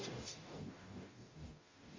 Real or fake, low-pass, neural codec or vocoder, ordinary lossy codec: fake; 7.2 kHz; codec, 44.1 kHz, 0.9 kbps, DAC; MP3, 32 kbps